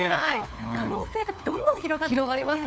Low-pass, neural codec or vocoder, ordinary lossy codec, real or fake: none; codec, 16 kHz, 4 kbps, FunCodec, trained on LibriTTS, 50 frames a second; none; fake